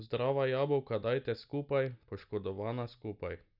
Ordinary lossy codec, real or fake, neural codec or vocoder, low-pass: none; real; none; 5.4 kHz